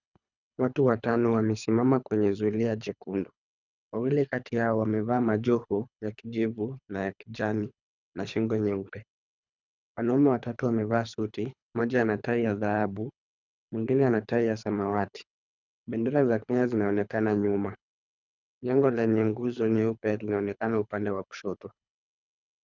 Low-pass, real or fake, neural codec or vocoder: 7.2 kHz; fake; codec, 24 kHz, 3 kbps, HILCodec